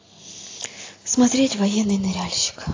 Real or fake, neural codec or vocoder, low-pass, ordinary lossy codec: real; none; 7.2 kHz; AAC, 32 kbps